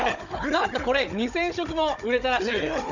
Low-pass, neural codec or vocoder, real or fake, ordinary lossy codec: 7.2 kHz; codec, 16 kHz, 16 kbps, FunCodec, trained on Chinese and English, 50 frames a second; fake; none